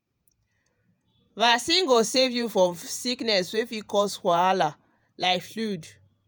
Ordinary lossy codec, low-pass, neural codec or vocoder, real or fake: none; none; vocoder, 48 kHz, 128 mel bands, Vocos; fake